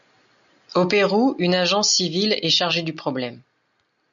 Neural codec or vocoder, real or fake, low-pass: none; real; 7.2 kHz